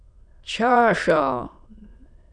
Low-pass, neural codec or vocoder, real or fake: 9.9 kHz; autoencoder, 22.05 kHz, a latent of 192 numbers a frame, VITS, trained on many speakers; fake